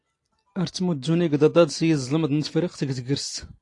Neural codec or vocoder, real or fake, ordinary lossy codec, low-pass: none; real; AAC, 48 kbps; 10.8 kHz